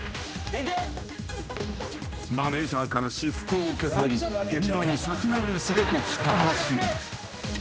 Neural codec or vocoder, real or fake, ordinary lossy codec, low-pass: codec, 16 kHz, 1 kbps, X-Codec, HuBERT features, trained on general audio; fake; none; none